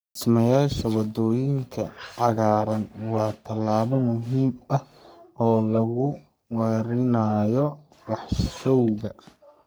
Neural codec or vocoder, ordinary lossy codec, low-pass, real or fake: codec, 44.1 kHz, 3.4 kbps, Pupu-Codec; none; none; fake